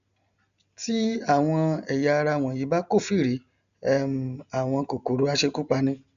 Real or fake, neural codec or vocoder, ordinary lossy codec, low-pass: real; none; none; 7.2 kHz